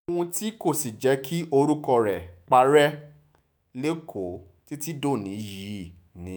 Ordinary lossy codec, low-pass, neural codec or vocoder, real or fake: none; none; autoencoder, 48 kHz, 128 numbers a frame, DAC-VAE, trained on Japanese speech; fake